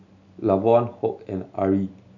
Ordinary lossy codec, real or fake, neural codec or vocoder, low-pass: none; real; none; 7.2 kHz